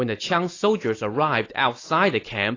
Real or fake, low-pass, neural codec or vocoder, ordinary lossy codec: real; 7.2 kHz; none; AAC, 32 kbps